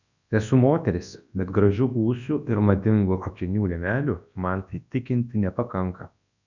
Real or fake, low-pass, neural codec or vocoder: fake; 7.2 kHz; codec, 24 kHz, 0.9 kbps, WavTokenizer, large speech release